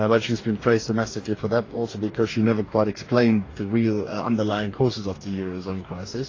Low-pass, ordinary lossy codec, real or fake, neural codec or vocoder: 7.2 kHz; AAC, 32 kbps; fake; codec, 44.1 kHz, 2.6 kbps, DAC